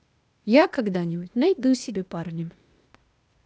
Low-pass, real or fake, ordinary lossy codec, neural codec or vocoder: none; fake; none; codec, 16 kHz, 0.8 kbps, ZipCodec